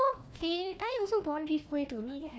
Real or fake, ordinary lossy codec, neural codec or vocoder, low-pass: fake; none; codec, 16 kHz, 1 kbps, FunCodec, trained on Chinese and English, 50 frames a second; none